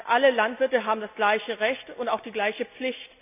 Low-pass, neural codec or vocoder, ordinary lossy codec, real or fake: 3.6 kHz; none; none; real